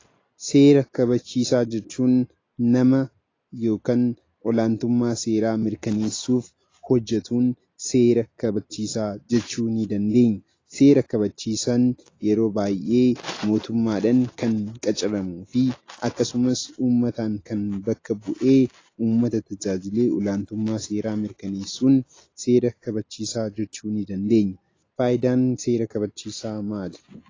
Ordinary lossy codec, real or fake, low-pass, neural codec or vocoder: AAC, 32 kbps; real; 7.2 kHz; none